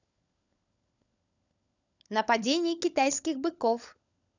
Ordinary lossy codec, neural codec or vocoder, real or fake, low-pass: none; codec, 16 kHz, 16 kbps, FunCodec, trained on LibriTTS, 50 frames a second; fake; 7.2 kHz